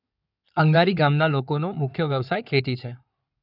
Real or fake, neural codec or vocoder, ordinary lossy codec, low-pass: fake; codec, 16 kHz in and 24 kHz out, 2.2 kbps, FireRedTTS-2 codec; none; 5.4 kHz